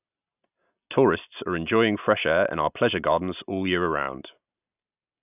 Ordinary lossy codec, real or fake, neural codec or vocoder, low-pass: none; real; none; 3.6 kHz